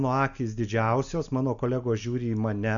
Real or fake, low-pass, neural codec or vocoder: real; 7.2 kHz; none